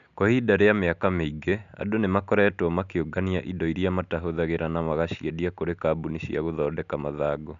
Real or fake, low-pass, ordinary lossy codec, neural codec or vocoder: real; 7.2 kHz; none; none